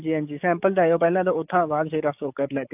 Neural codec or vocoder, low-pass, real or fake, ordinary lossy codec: codec, 44.1 kHz, 7.8 kbps, DAC; 3.6 kHz; fake; AAC, 32 kbps